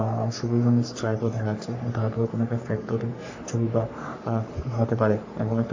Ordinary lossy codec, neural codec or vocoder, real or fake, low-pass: AAC, 48 kbps; codec, 44.1 kHz, 3.4 kbps, Pupu-Codec; fake; 7.2 kHz